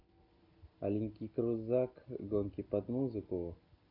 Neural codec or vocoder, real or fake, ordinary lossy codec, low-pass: none; real; none; 5.4 kHz